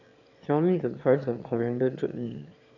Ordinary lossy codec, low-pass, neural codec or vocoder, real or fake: none; 7.2 kHz; autoencoder, 22.05 kHz, a latent of 192 numbers a frame, VITS, trained on one speaker; fake